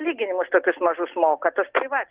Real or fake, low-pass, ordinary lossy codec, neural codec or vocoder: real; 3.6 kHz; Opus, 16 kbps; none